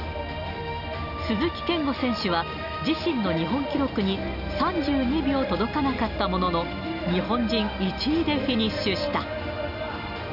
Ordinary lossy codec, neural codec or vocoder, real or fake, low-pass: none; none; real; 5.4 kHz